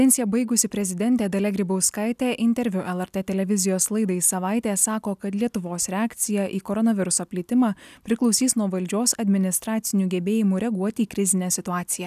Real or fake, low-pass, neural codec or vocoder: real; 14.4 kHz; none